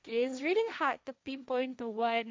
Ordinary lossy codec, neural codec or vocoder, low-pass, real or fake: none; codec, 16 kHz, 1.1 kbps, Voila-Tokenizer; none; fake